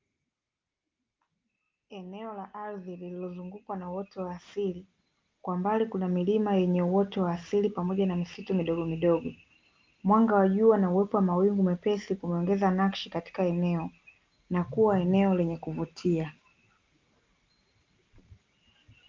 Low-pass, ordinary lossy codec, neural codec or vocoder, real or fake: 7.2 kHz; Opus, 24 kbps; none; real